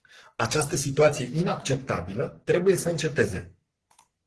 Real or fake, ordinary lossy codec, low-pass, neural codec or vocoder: fake; Opus, 16 kbps; 10.8 kHz; codec, 44.1 kHz, 3.4 kbps, Pupu-Codec